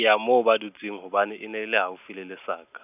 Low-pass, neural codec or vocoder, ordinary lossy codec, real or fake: 3.6 kHz; none; none; real